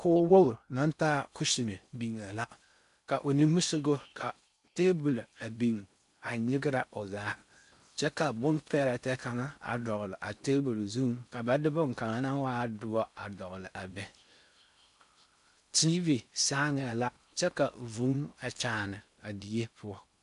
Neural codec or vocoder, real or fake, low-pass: codec, 16 kHz in and 24 kHz out, 0.6 kbps, FocalCodec, streaming, 2048 codes; fake; 10.8 kHz